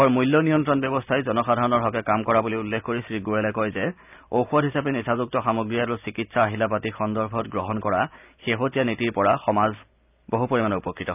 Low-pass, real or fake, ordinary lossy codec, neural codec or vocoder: 3.6 kHz; real; none; none